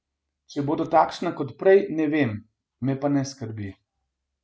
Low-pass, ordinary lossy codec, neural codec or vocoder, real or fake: none; none; none; real